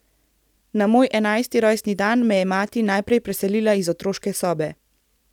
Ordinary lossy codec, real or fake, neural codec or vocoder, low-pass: none; real; none; 19.8 kHz